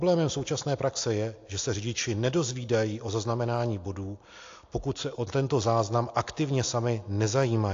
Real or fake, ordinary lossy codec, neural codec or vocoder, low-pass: real; AAC, 48 kbps; none; 7.2 kHz